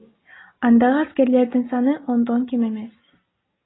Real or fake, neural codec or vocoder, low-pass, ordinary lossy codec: real; none; 7.2 kHz; AAC, 16 kbps